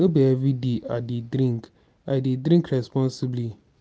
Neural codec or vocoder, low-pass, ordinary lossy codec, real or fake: none; none; none; real